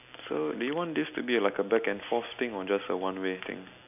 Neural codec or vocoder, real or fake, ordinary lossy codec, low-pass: none; real; none; 3.6 kHz